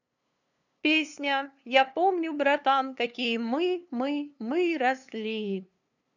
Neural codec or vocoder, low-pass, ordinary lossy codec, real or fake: codec, 16 kHz, 2 kbps, FunCodec, trained on LibriTTS, 25 frames a second; 7.2 kHz; none; fake